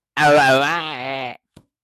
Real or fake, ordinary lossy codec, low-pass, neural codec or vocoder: fake; AAC, 64 kbps; 14.4 kHz; autoencoder, 48 kHz, 128 numbers a frame, DAC-VAE, trained on Japanese speech